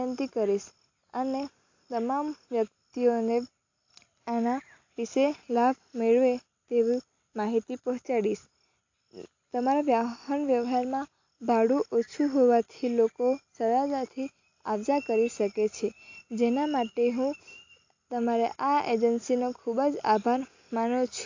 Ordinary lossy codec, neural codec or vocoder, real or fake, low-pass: AAC, 48 kbps; none; real; 7.2 kHz